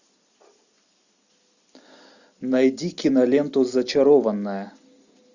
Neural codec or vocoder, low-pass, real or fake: none; 7.2 kHz; real